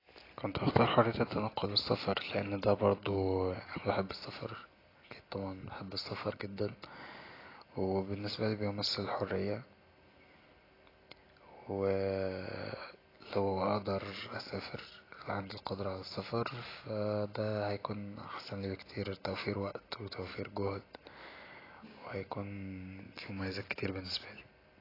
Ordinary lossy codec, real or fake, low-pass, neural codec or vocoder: AAC, 24 kbps; real; 5.4 kHz; none